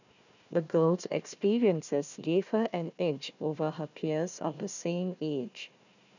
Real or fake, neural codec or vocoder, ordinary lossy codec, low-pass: fake; codec, 16 kHz, 1 kbps, FunCodec, trained on Chinese and English, 50 frames a second; none; 7.2 kHz